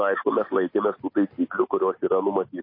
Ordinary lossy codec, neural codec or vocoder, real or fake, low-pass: MP3, 24 kbps; none; real; 5.4 kHz